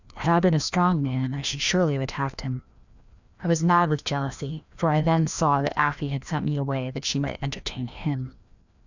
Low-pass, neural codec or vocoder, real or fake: 7.2 kHz; codec, 16 kHz, 1 kbps, FreqCodec, larger model; fake